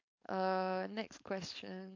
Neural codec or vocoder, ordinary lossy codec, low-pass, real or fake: codec, 16 kHz, 4.8 kbps, FACodec; AAC, 48 kbps; 7.2 kHz; fake